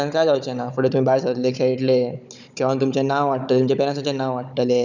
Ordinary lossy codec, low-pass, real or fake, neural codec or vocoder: none; 7.2 kHz; fake; codec, 16 kHz, 16 kbps, FunCodec, trained on Chinese and English, 50 frames a second